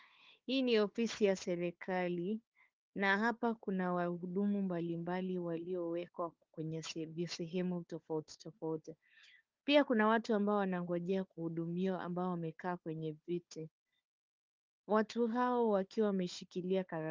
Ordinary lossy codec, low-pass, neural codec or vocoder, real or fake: Opus, 32 kbps; 7.2 kHz; codec, 16 kHz, 4 kbps, FunCodec, trained on Chinese and English, 50 frames a second; fake